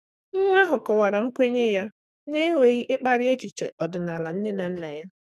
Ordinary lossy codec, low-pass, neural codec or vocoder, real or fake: none; 14.4 kHz; codec, 44.1 kHz, 2.6 kbps, SNAC; fake